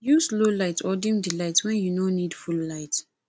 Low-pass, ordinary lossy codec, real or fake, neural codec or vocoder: none; none; real; none